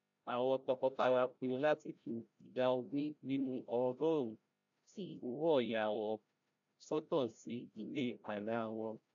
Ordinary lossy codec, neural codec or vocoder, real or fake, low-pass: AAC, 64 kbps; codec, 16 kHz, 0.5 kbps, FreqCodec, larger model; fake; 7.2 kHz